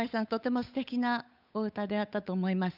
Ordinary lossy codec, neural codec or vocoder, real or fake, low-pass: none; codec, 16 kHz, 8 kbps, FunCodec, trained on Chinese and English, 25 frames a second; fake; 5.4 kHz